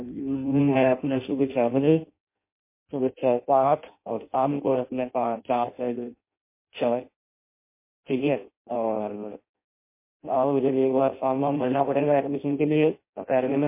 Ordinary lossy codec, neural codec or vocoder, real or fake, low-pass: MP3, 24 kbps; codec, 16 kHz in and 24 kHz out, 0.6 kbps, FireRedTTS-2 codec; fake; 3.6 kHz